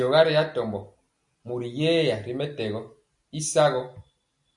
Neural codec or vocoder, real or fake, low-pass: none; real; 10.8 kHz